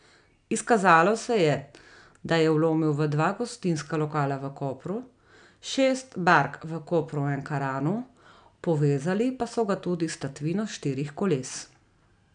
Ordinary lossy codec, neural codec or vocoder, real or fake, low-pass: none; none; real; 9.9 kHz